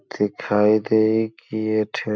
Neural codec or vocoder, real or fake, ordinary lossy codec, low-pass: none; real; none; none